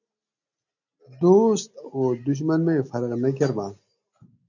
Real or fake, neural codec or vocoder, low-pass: real; none; 7.2 kHz